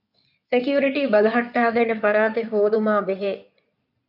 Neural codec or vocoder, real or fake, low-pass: codec, 16 kHz in and 24 kHz out, 2.2 kbps, FireRedTTS-2 codec; fake; 5.4 kHz